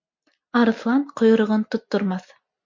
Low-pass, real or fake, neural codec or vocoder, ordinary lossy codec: 7.2 kHz; real; none; MP3, 48 kbps